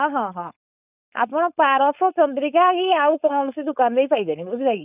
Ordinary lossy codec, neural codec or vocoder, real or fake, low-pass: none; codec, 16 kHz, 4.8 kbps, FACodec; fake; 3.6 kHz